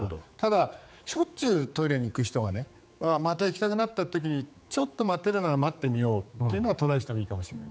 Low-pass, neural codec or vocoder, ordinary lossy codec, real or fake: none; codec, 16 kHz, 4 kbps, X-Codec, HuBERT features, trained on general audio; none; fake